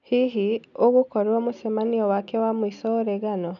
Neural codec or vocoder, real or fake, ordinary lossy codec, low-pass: none; real; none; 7.2 kHz